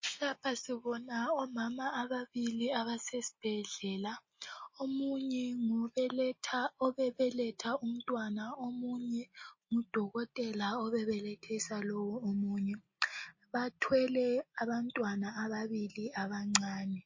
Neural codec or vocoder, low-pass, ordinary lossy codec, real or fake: none; 7.2 kHz; MP3, 32 kbps; real